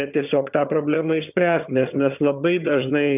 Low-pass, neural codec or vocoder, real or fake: 3.6 kHz; vocoder, 22.05 kHz, 80 mel bands, HiFi-GAN; fake